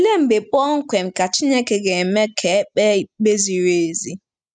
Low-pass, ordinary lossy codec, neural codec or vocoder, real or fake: 9.9 kHz; none; none; real